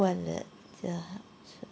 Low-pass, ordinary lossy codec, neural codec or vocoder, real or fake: none; none; none; real